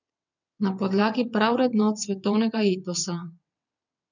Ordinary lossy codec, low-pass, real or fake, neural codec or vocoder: none; 7.2 kHz; fake; vocoder, 22.05 kHz, 80 mel bands, WaveNeXt